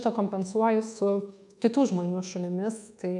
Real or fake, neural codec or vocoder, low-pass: fake; codec, 24 kHz, 1.2 kbps, DualCodec; 10.8 kHz